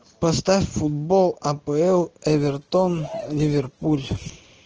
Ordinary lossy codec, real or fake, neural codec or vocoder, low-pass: Opus, 16 kbps; real; none; 7.2 kHz